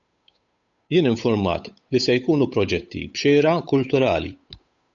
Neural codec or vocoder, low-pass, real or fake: codec, 16 kHz, 8 kbps, FunCodec, trained on Chinese and English, 25 frames a second; 7.2 kHz; fake